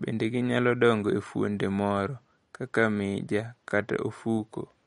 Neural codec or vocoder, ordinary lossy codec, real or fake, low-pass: none; MP3, 48 kbps; real; 19.8 kHz